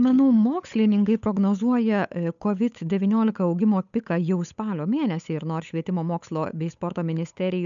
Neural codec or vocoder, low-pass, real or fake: codec, 16 kHz, 8 kbps, FunCodec, trained on Chinese and English, 25 frames a second; 7.2 kHz; fake